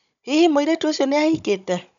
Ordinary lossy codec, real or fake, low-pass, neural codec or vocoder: none; fake; 7.2 kHz; codec, 16 kHz, 16 kbps, FunCodec, trained on Chinese and English, 50 frames a second